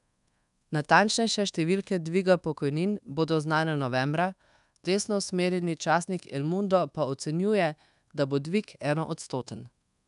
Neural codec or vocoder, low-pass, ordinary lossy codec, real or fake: codec, 24 kHz, 1.2 kbps, DualCodec; 10.8 kHz; none; fake